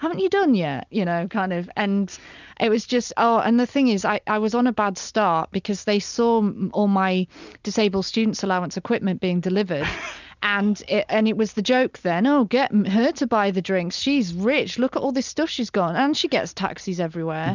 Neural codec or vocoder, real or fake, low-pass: none; real; 7.2 kHz